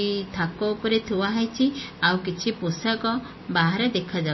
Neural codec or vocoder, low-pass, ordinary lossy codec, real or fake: none; 7.2 kHz; MP3, 24 kbps; real